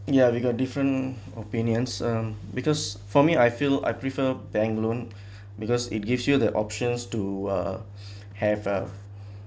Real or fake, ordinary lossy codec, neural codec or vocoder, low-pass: real; none; none; none